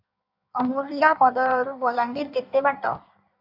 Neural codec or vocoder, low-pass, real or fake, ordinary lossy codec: codec, 16 kHz in and 24 kHz out, 1.1 kbps, FireRedTTS-2 codec; 5.4 kHz; fake; AAC, 48 kbps